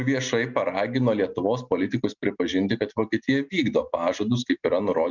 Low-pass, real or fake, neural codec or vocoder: 7.2 kHz; real; none